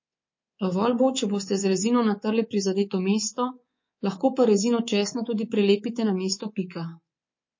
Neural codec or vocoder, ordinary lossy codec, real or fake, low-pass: codec, 24 kHz, 3.1 kbps, DualCodec; MP3, 32 kbps; fake; 7.2 kHz